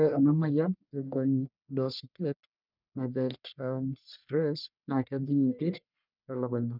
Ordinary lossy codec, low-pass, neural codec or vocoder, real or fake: none; 5.4 kHz; codec, 44.1 kHz, 1.7 kbps, Pupu-Codec; fake